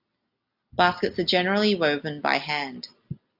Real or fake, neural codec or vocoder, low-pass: real; none; 5.4 kHz